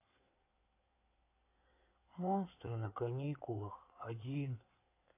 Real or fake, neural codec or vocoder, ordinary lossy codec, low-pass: fake; codec, 16 kHz in and 24 kHz out, 2.2 kbps, FireRedTTS-2 codec; AAC, 24 kbps; 3.6 kHz